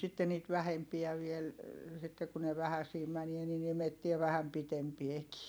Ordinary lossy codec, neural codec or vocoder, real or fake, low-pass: none; vocoder, 44.1 kHz, 128 mel bands every 256 samples, BigVGAN v2; fake; none